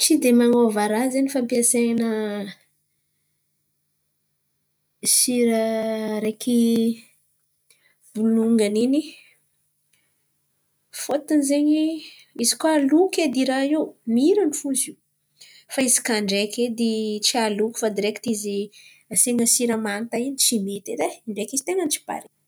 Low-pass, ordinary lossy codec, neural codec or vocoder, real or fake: none; none; none; real